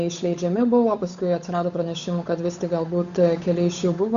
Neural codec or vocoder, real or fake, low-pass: codec, 16 kHz, 8 kbps, FunCodec, trained on Chinese and English, 25 frames a second; fake; 7.2 kHz